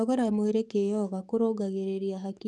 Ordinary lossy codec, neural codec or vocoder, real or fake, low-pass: none; codec, 44.1 kHz, 7.8 kbps, DAC; fake; 10.8 kHz